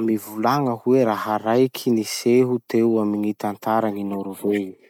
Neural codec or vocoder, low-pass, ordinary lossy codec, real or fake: none; 19.8 kHz; none; real